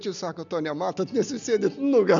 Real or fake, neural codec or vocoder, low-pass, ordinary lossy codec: real; none; 7.2 kHz; MP3, 96 kbps